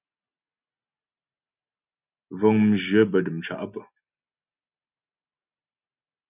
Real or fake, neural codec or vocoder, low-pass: real; none; 3.6 kHz